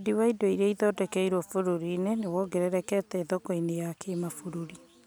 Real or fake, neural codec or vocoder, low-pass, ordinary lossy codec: real; none; none; none